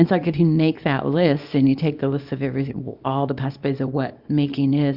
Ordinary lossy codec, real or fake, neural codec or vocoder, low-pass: Opus, 64 kbps; fake; codec, 24 kHz, 0.9 kbps, WavTokenizer, small release; 5.4 kHz